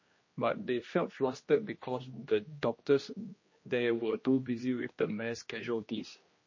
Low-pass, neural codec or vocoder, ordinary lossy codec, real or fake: 7.2 kHz; codec, 16 kHz, 1 kbps, X-Codec, HuBERT features, trained on general audio; MP3, 32 kbps; fake